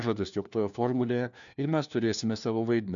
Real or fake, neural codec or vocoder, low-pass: fake; codec, 16 kHz, 1 kbps, FunCodec, trained on LibriTTS, 50 frames a second; 7.2 kHz